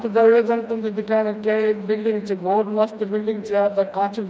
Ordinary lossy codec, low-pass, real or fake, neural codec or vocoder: none; none; fake; codec, 16 kHz, 1 kbps, FreqCodec, smaller model